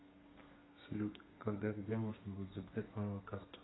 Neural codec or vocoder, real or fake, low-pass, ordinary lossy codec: codec, 32 kHz, 1.9 kbps, SNAC; fake; 7.2 kHz; AAC, 16 kbps